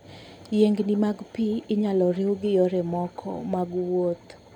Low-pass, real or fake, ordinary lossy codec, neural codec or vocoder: 19.8 kHz; real; none; none